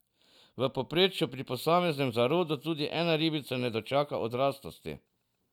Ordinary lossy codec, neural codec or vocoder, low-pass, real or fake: none; none; 19.8 kHz; real